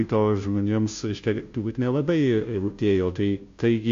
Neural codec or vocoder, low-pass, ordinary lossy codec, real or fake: codec, 16 kHz, 0.5 kbps, FunCodec, trained on Chinese and English, 25 frames a second; 7.2 kHz; AAC, 64 kbps; fake